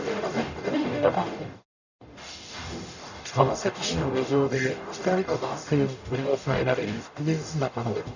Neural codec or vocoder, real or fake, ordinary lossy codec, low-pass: codec, 44.1 kHz, 0.9 kbps, DAC; fake; none; 7.2 kHz